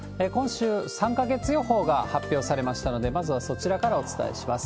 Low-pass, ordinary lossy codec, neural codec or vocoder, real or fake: none; none; none; real